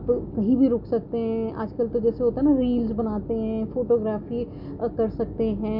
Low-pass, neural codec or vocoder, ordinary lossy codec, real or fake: 5.4 kHz; none; none; real